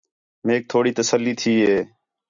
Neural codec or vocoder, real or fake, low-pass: none; real; 7.2 kHz